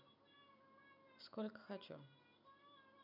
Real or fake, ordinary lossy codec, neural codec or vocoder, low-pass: real; none; none; 5.4 kHz